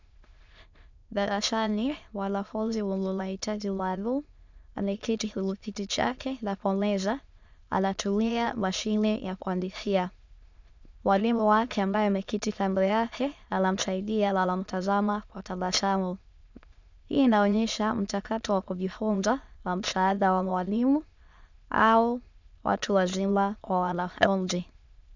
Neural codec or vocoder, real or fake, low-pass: autoencoder, 22.05 kHz, a latent of 192 numbers a frame, VITS, trained on many speakers; fake; 7.2 kHz